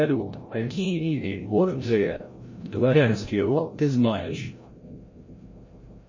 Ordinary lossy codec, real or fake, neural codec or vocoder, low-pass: MP3, 32 kbps; fake; codec, 16 kHz, 0.5 kbps, FreqCodec, larger model; 7.2 kHz